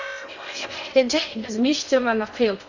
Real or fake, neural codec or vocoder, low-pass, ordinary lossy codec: fake; codec, 16 kHz in and 24 kHz out, 0.6 kbps, FocalCodec, streaming, 2048 codes; 7.2 kHz; none